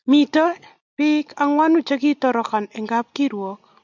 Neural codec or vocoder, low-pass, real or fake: none; 7.2 kHz; real